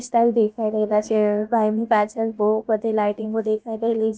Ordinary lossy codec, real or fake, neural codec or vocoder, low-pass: none; fake; codec, 16 kHz, about 1 kbps, DyCAST, with the encoder's durations; none